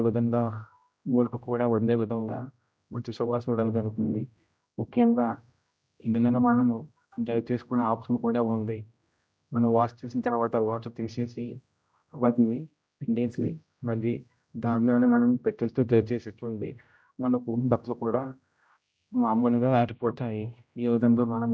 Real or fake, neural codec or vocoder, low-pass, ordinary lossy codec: fake; codec, 16 kHz, 0.5 kbps, X-Codec, HuBERT features, trained on general audio; none; none